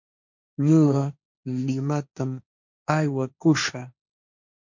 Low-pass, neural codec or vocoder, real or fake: 7.2 kHz; codec, 16 kHz, 1.1 kbps, Voila-Tokenizer; fake